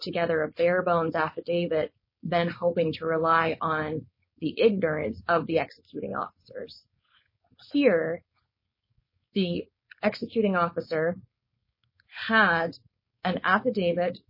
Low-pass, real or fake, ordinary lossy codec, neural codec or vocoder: 5.4 kHz; fake; MP3, 24 kbps; codec, 16 kHz, 4.8 kbps, FACodec